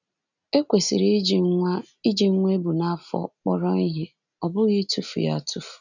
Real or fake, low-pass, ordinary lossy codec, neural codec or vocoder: real; 7.2 kHz; none; none